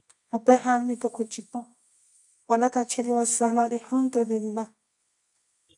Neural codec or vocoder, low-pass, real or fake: codec, 24 kHz, 0.9 kbps, WavTokenizer, medium music audio release; 10.8 kHz; fake